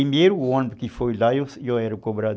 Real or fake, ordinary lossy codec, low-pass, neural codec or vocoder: real; none; none; none